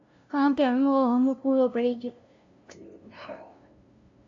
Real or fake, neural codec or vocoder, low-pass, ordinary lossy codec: fake; codec, 16 kHz, 0.5 kbps, FunCodec, trained on LibriTTS, 25 frames a second; 7.2 kHz; AAC, 64 kbps